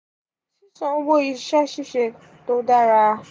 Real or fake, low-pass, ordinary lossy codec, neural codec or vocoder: real; none; none; none